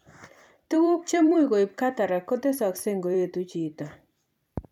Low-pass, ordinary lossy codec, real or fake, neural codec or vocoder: 19.8 kHz; none; fake; vocoder, 44.1 kHz, 128 mel bands every 256 samples, BigVGAN v2